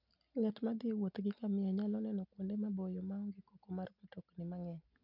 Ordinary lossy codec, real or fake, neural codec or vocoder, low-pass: none; real; none; 5.4 kHz